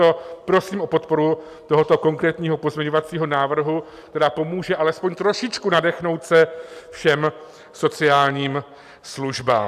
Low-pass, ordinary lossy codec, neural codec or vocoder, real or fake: 14.4 kHz; AAC, 96 kbps; none; real